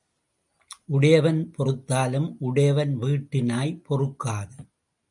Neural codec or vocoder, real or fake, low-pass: none; real; 10.8 kHz